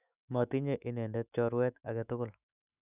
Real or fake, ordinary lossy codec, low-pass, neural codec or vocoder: fake; none; 3.6 kHz; autoencoder, 48 kHz, 128 numbers a frame, DAC-VAE, trained on Japanese speech